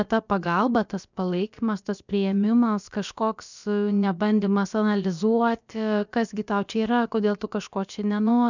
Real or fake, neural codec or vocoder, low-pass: fake; codec, 16 kHz, about 1 kbps, DyCAST, with the encoder's durations; 7.2 kHz